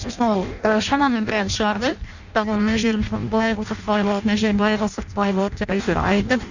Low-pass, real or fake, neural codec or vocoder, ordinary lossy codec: 7.2 kHz; fake; codec, 16 kHz in and 24 kHz out, 0.6 kbps, FireRedTTS-2 codec; none